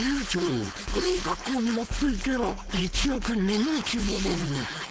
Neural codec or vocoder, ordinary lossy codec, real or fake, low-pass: codec, 16 kHz, 4.8 kbps, FACodec; none; fake; none